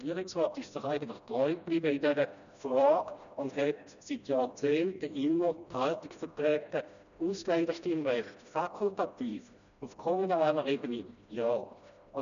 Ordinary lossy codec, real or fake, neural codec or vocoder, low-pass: none; fake; codec, 16 kHz, 1 kbps, FreqCodec, smaller model; 7.2 kHz